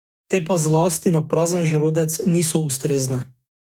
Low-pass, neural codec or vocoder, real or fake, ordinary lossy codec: 19.8 kHz; codec, 44.1 kHz, 2.6 kbps, DAC; fake; none